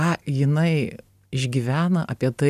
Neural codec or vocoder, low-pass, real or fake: none; 14.4 kHz; real